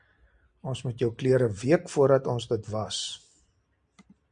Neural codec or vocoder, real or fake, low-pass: none; real; 9.9 kHz